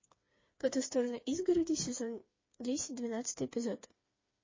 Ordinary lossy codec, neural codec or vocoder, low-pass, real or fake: MP3, 32 kbps; codec, 16 kHz, 4 kbps, FreqCodec, smaller model; 7.2 kHz; fake